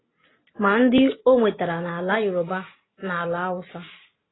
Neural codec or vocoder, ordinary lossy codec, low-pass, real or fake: none; AAC, 16 kbps; 7.2 kHz; real